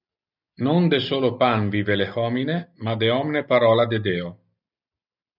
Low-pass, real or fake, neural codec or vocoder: 5.4 kHz; real; none